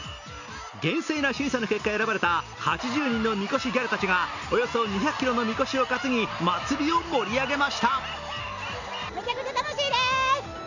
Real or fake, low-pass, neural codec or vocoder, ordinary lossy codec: real; 7.2 kHz; none; none